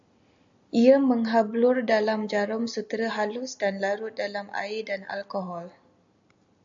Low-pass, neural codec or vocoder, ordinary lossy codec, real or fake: 7.2 kHz; none; AAC, 64 kbps; real